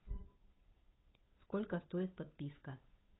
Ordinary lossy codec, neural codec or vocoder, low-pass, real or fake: AAC, 16 kbps; codec, 16 kHz, 8 kbps, FunCodec, trained on Chinese and English, 25 frames a second; 7.2 kHz; fake